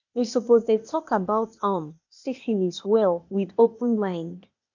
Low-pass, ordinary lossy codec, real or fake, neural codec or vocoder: 7.2 kHz; none; fake; codec, 16 kHz, 0.8 kbps, ZipCodec